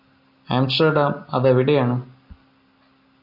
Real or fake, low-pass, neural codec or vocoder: real; 5.4 kHz; none